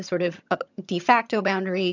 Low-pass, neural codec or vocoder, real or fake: 7.2 kHz; vocoder, 22.05 kHz, 80 mel bands, HiFi-GAN; fake